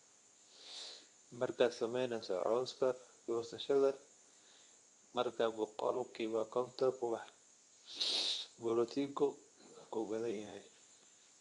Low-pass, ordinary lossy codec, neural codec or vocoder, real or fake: 10.8 kHz; none; codec, 24 kHz, 0.9 kbps, WavTokenizer, medium speech release version 2; fake